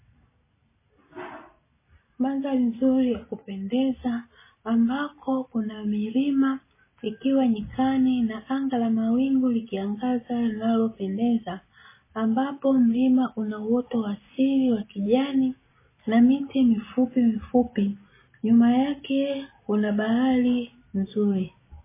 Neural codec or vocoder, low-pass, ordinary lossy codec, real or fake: none; 3.6 kHz; MP3, 16 kbps; real